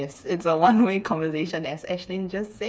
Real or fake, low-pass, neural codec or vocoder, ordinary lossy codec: fake; none; codec, 16 kHz, 4 kbps, FreqCodec, smaller model; none